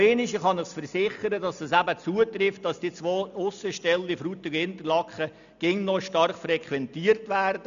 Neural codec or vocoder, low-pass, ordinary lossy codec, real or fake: none; 7.2 kHz; none; real